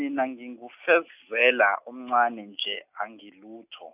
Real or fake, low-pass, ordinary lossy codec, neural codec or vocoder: real; 3.6 kHz; none; none